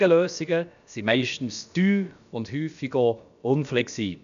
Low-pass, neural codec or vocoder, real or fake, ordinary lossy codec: 7.2 kHz; codec, 16 kHz, about 1 kbps, DyCAST, with the encoder's durations; fake; none